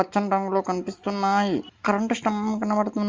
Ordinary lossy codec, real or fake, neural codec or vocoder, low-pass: Opus, 32 kbps; real; none; 7.2 kHz